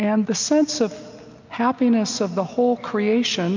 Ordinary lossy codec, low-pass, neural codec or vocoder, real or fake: MP3, 48 kbps; 7.2 kHz; none; real